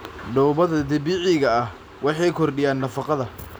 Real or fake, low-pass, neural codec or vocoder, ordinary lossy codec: real; none; none; none